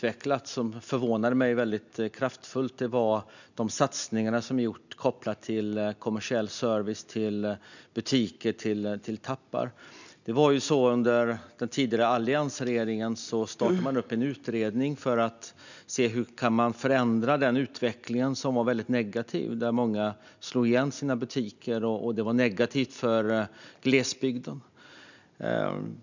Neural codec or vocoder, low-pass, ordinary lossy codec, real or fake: none; 7.2 kHz; none; real